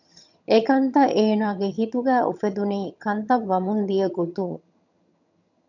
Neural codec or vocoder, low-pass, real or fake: vocoder, 22.05 kHz, 80 mel bands, HiFi-GAN; 7.2 kHz; fake